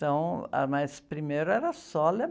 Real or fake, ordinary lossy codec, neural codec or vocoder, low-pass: real; none; none; none